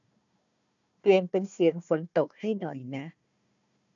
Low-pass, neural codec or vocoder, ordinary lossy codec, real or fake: 7.2 kHz; codec, 16 kHz, 1 kbps, FunCodec, trained on Chinese and English, 50 frames a second; none; fake